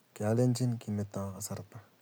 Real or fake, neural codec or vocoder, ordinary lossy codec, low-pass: real; none; none; none